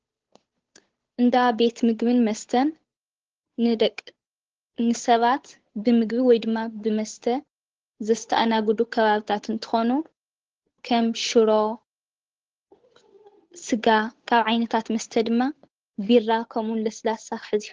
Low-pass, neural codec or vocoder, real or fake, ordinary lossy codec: 7.2 kHz; codec, 16 kHz, 8 kbps, FunCodec, trained on Chinese and English, 25 frames a second; fake; Opus, 16 kbps